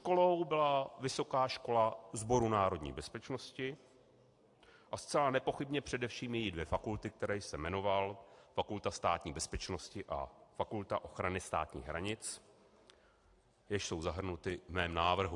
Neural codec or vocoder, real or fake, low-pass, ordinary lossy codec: none; real; 10.8 kHz; AAC, 64 kbps